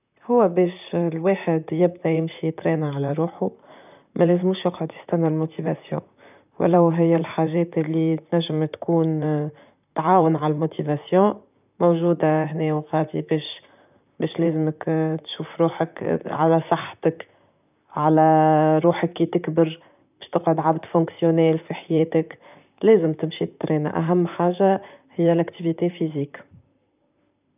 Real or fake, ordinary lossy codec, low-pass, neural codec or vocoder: fake; none; 3.6 kHz; vocoder, 44.1 kHz, 128 mel bands, Pupu-Vocoder